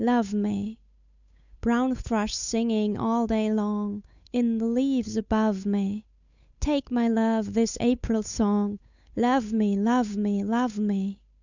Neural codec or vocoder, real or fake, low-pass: codec, 16 kHz, 8 kbps, FunCodec, trained on Chinese and English, 25 frames a second; fake; 7.2 kHz